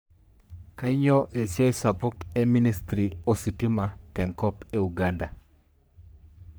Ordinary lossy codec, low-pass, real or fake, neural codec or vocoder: none; none; fake; codec, 44.1 kHz, 3.4 kbps, Pupu-Codec